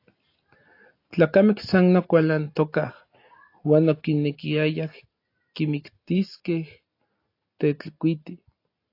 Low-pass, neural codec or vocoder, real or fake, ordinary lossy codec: 5.4 kHz; none; real; AAC, 32 kbps